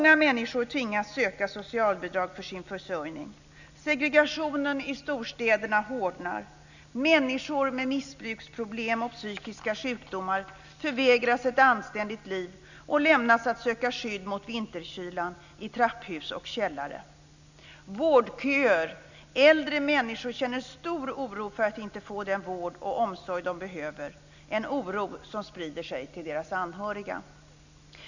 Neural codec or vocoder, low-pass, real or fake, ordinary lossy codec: none; 7.2 kHz; real; none